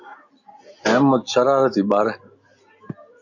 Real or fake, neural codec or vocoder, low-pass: real; none; 7.2 kHz